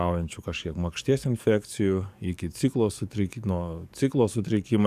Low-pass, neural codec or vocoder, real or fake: 14.4 kHz; codec, 44.1 kHz, 7.8 kbps, DAC; fake